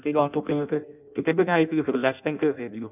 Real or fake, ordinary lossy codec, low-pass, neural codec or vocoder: fake; none; 3.6 kHz; codec, 16 kHz in and 24 kHz out, 0.6 kbps, FireRedTTS-2 codec